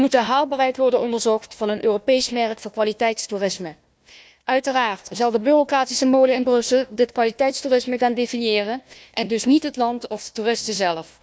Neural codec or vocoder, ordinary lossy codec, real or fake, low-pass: codec, 16 kHz, 1 kbps, FunCodec, trained on Chinese and English, 50 frames a second; none; fake; none